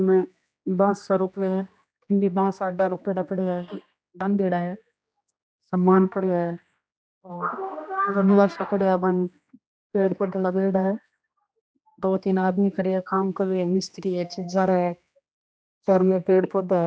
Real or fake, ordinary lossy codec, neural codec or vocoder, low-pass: fake; none; codec, 16 kHz, 1 kbps, X-Codec, HuBERT features, trained on general audio; none